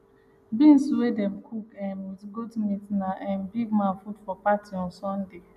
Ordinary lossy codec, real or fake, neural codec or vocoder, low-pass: none; real; none; 14.4 kHz